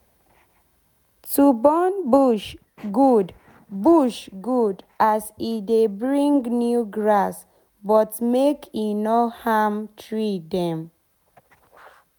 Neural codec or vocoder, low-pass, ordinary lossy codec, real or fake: none; none; none; real